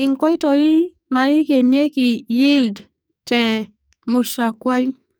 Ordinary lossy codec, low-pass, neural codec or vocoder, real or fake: none; none; codec, 44.1 kHz, 2.6 kbps, SNAC; fake